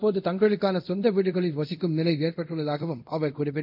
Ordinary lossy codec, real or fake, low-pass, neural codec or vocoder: none; fake; 5.4 kHz; codec, 24 kHz, 0.5 kbps, DualCodec